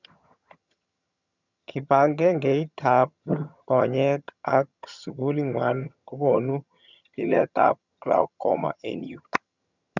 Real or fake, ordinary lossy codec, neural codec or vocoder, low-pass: fake; none; vocoder, 22.05 kHz, 80 mel bands, HiFi-GAN; 7.2 kHz